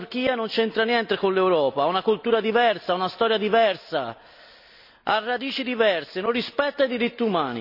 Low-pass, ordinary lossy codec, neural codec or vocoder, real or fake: 5.4 kHz; none; none; real